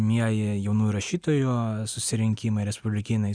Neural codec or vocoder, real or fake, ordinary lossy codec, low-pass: none; real; Opus, 64 kbps; 9.9 kHz